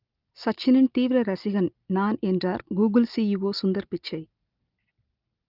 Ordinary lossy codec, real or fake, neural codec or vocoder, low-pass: Opus, 32 kbps; real; none; 5.4 kHz